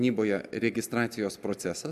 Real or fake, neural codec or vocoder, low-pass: real; none; 14.4 kHz